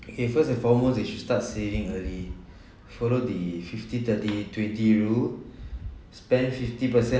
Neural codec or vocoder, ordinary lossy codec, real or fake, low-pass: none; none; real; none